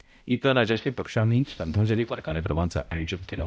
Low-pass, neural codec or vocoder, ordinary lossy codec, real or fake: none; codec, 16 kHz, 0.5 kbps, X-Codec, HuBERT features, trained on balanced general audio; none; fake